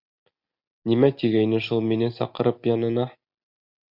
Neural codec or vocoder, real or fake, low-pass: none; real; 5.4 kHz